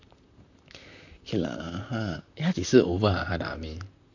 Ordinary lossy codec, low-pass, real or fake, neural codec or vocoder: none; 7.2 kHz; fake; vocoder, 44.1 kHz, 128 mel bands, Pupu-Vocoder